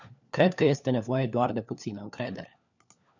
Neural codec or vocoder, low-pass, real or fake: codec, 16 kHz, 4 kbps, FunCodec, trained on LibriTTS, 50 frames a second; 7.2 kHz; fake